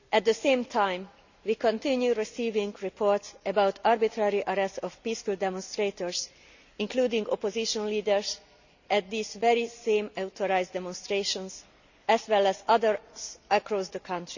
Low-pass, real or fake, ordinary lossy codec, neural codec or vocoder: 7.2 kHz; real; none; none